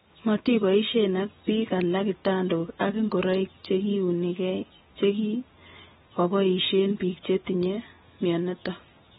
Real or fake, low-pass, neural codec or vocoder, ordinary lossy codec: real; 19.8 kHz; none; AAC, 16 kbps